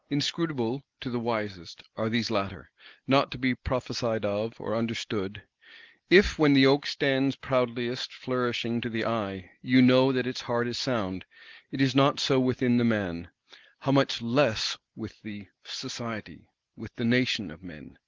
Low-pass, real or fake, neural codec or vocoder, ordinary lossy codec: 7.2 kHz; real; none; Opus, 16 kbps